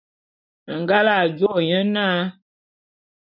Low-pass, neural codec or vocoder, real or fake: 5.4 kHz; none; real